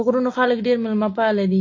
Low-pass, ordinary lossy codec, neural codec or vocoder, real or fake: 7.2 kHz; AAC, 32 kbps; none; real